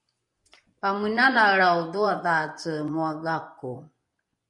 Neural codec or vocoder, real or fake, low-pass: vocoder, 24 kHz, 100 mel bands, Vocos; fake; 10.8 kHz